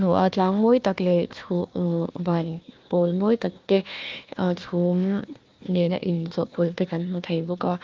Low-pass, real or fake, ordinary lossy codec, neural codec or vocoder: 7.2 kHz; fake; Opus, 24 kbps; codec, 16 kHz, 1 kbps, FunCodec, trained on Chinese and English, 50 frames a second